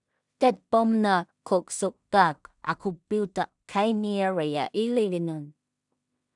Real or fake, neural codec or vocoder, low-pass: fake; codec, 16 kHz in and 24 kHz out, 0.4 kbps, LongCat-Audio-Codec, two codebook decoder; 10.8 kHz